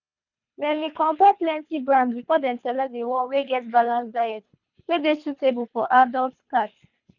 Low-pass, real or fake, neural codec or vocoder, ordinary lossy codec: 7.2 kHz; fake; codec, 24 kHz, 3 kbps, HILCodec; none